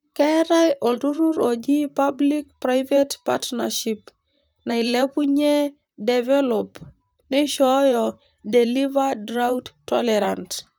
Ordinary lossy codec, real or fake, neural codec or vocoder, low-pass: none; fake; vocoder, 44.1 kHz, 128 mel bands, Pupu-Vocoder; none